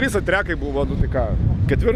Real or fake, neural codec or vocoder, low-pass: real; none; 14.4 kHz